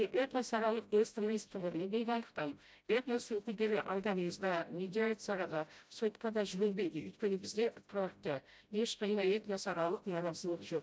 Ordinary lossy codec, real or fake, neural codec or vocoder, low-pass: none; fake; codec, 16 kHz, 0.5 kbps, FreqCodec, smaller model; none